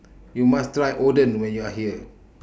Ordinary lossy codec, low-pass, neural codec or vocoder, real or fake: none; none; none; real